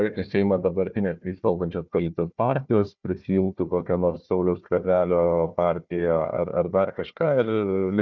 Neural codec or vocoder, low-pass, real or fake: codec, 16 kHz, 1 kbps, FunCodec, trained on Chinese and English, 50 frames a second; 7.2 kHz; fake